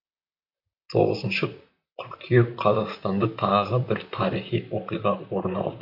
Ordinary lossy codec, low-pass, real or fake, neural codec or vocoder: AAC, 48 kbps; 5.4 kHz; fake; vocoder, 44.1 kHz, 128 mel bands, Pupu-Vocoder